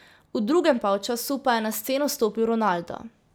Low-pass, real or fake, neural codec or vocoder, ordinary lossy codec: none; real; none; none